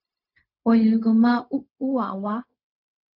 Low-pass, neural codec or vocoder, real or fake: 5.4 kHz; codec, 16 kHz, 0.4 kbps, LongCat-Audio-Codec; fake